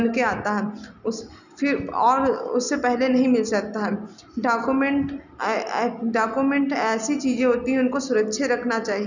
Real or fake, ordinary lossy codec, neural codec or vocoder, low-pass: real; none; none; 7.2 kHz